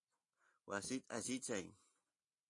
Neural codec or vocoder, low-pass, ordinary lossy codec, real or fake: none; 10.8 kHz; AAC, 48 kbps; real